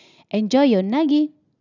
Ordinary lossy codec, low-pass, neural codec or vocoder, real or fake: none; 7.2 kHz; none; real